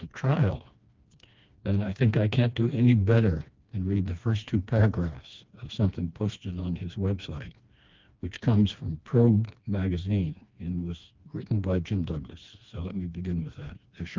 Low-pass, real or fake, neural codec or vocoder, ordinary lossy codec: 7.2 kHz; fake; codec, 16 kHz, 2 kbps, FreqCodec, smaller model; Opus, 24 kbps